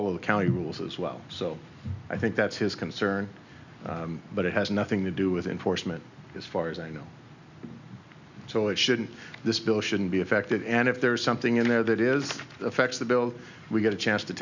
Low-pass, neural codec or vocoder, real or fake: 7.2 kHz; none; real